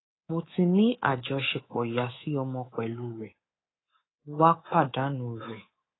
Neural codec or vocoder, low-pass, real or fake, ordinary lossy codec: codec, 24 kHz, 3.1 kbps, DualCodec; 7.2 kHz; fake; AAC, 16 kbps